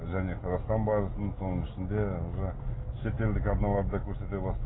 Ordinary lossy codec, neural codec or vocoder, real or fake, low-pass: AAC, 16 kbps; none; real; 7.2 kHz